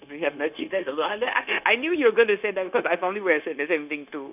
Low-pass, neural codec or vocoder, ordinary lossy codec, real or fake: 3.6 kHz; codec, 16 kHz, 0.9 kbps, LongCat-Audio-Codec; none; fake